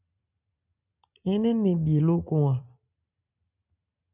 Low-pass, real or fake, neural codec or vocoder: 3.6 kHz; real; none